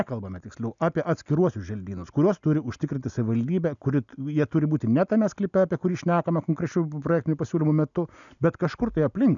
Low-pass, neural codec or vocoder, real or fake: 7.2 kHz; none; real